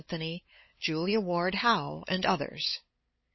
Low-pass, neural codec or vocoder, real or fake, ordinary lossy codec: 7.2 kHz; codec, 16 kHz, 8 kbps, FunCodec, trained on LibriTTS, 25 frames a second; fake; MP3, 24 kbps